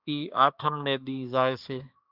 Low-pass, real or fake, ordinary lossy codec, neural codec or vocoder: 5.4 kHz; fake; Opus, 64 kbps; codec, 16 kHz, 2 kbps, X-Codec, HuBERT features, trained on balanced general audio